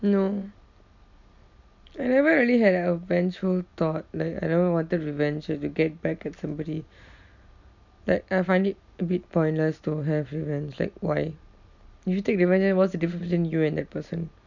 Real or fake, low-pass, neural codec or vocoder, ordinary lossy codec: real; 7.2 kHz; none; none